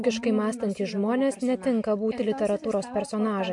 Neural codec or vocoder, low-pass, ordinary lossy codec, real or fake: none; 10.8 kHz; MP3, 64 kbps; real